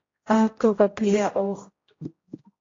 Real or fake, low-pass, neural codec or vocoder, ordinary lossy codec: fake; 7.2 kHz; codec, 16 kHz, 0.5 kbps, X-Codec, HuBERT features, trained on general audio; AAC, 32 kbps